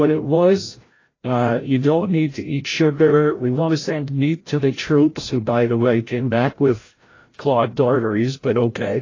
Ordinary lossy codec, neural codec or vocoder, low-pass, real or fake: AAC, 32 kbps; codec, 16 kHz, 0.5 kbps, FreqCodec, larger model; 7.2 kHz; fake